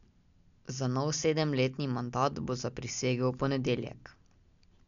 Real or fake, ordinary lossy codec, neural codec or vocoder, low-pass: real; none; none; 7.2 kHz